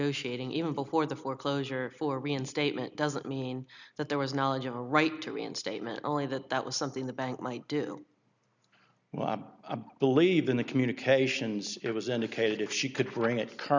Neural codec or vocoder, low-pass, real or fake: none; 7.2 kHz; real